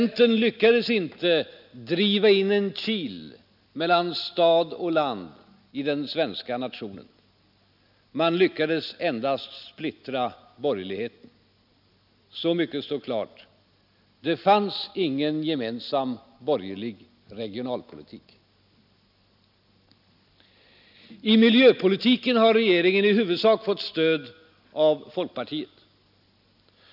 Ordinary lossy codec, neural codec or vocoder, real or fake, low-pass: none; none; real; 5.4 kHz